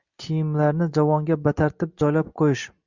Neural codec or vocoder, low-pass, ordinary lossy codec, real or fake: none; 7.2 kHz; Opus, 64 kbps; real